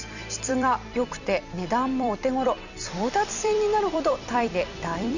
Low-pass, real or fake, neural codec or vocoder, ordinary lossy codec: 7.2 kHz; fake; vocoder, 44.1 kHz, 128 mel bands every 256 samples, BigVGAN v2; none